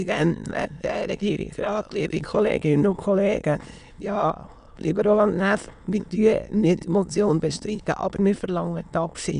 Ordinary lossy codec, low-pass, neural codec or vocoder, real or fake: none; 9.9 kHz; autoencoder, 22.05 kHz, a latent of 192 numbers a frame, VITS, trained on many speakers; fake